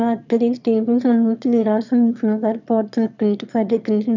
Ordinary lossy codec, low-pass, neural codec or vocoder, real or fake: none; 7.2 kHz; autoencoder, 22.05 kHz, a latent of 192 numbers a frame, VITS, trained on one speaker; fake